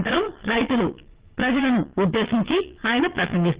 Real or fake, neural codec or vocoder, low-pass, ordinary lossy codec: fake; vocoder, 22.05 kHz, 80 mel bands, Vocos; 3.6 kHz; Opus, 16 kbps